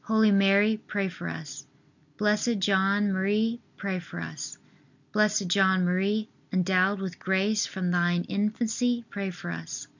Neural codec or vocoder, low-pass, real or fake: none; 7.2 kHz; real